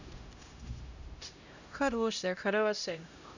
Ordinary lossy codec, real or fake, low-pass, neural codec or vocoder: none; fake; 7.2 kHz; codec, 16 kHz, 0.5 kbps, X-Codec, HuBERT features, trained on LibriSpeech